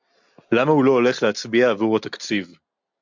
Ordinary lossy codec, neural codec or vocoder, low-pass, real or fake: MP3, 64 kbps; none; 7.2 kHz; real